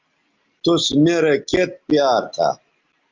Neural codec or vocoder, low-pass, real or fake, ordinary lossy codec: none; 7.2 kHz; real; Opus, 32 kbps